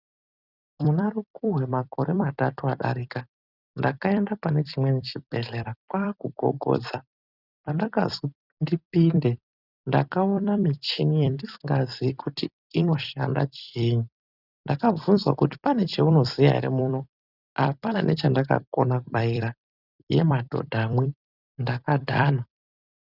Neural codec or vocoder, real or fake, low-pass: vocoder, 44.1 kHz, 128 mel bands every 256 samples, BigVGAN v2; fake; 5.4 kHz